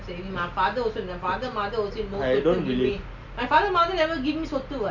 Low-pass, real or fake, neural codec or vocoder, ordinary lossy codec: 7.2 kHz; real; none; none